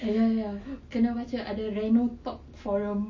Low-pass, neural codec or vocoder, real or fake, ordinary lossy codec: 7.2 kHz; none; real; MP3, 32 kbps